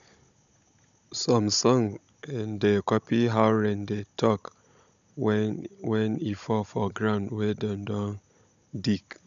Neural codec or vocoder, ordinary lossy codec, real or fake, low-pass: codec, 16 kHz, 16 kbps, FunCodec, trained on Chinese and English, 50 frames a second; AAC, 96 kbps; fake; 7.2 kHz